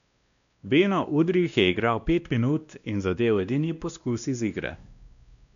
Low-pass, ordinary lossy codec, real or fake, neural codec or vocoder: 7.2 kHz; none; fake; codec, 16 kHz, 1 kbps, X-Codec, WavLM features, trained on Multilingual LibriSpeech